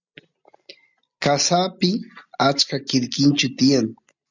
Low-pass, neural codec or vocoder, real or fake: 7.2 kHz; none; real